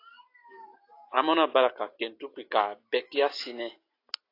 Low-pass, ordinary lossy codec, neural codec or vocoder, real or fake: 5.4 kHz; AAC, 32 kbps; none; real